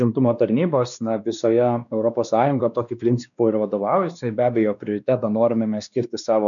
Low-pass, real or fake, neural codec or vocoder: 7.2 kHz; fake; codec, 16 kHz, 2 kbps, X-Codec, WavLM features, trained on Multilingual LibriSpeech